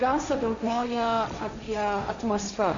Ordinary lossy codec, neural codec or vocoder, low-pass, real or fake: MP3, 64 kbps; codec, 16 kHz, 1.1 kbps, Voila-Tokenizer; 7.2 kHz; fake